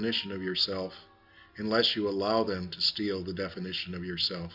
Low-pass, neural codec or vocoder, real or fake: 5.4 kHz; none; real